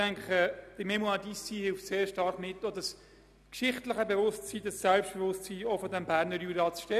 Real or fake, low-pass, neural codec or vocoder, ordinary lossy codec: real; 14.4 kHz; none; none